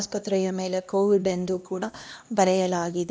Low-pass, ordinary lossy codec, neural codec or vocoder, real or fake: none; none; codec, 16 kHz, 1 kbps, X-Codec, HuBERT features, trained on LibriSpeech; fake